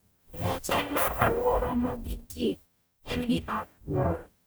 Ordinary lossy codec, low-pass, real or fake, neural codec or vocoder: none; none; fake; codec, 44.1 kHz, 0.9 kbps, DAC